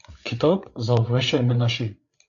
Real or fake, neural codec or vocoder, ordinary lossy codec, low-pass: fake; codec, 16 kHz, 8 kbps, FreqCodec, larger model; AAC, 64 kbps; 7.2 kHz